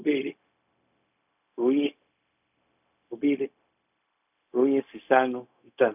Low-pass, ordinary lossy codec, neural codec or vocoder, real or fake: 3.6 kHz; none; codec, 16 kHz, 0.4 kbps, LongCat-Audio-Codec; fake